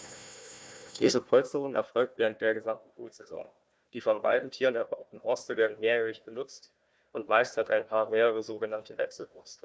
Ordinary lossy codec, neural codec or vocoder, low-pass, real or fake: none; codec, 16 kHz, 1 kbps, FunCodec, trained on Chinese and English, 50 frames a second; none; fake